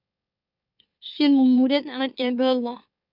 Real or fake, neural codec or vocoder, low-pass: fake; autoencoder, 44.1 kHz, a latent of 192 numbers a frame, MeloTTS; 5.4 kHz